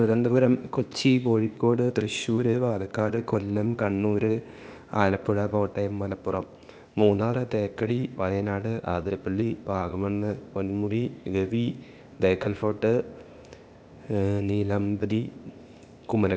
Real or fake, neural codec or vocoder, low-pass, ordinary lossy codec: fake; codec, 16 kHz, 0.8 kbps, ZipCodec; none; none